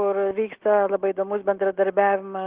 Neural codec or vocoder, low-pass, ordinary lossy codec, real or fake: none; 3.6 kHz; Opus, 16 kbps; real